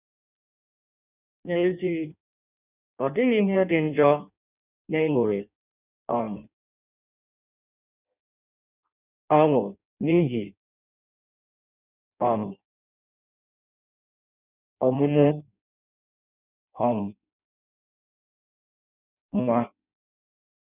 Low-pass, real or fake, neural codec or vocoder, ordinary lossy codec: 3.6 kHz; fake; codec, 16 kHz in and 24 kHz out, 0.6 kbps, FireRedTTS-2 codec; none